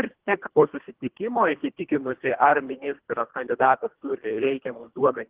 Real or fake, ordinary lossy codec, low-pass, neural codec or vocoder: fake; Opus, 16 kbps; 3.6 kHz; codec, 24 kHz, 1.5 kbps, HILCodec